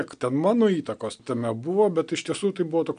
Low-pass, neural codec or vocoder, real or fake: 9.9 kHz; none; real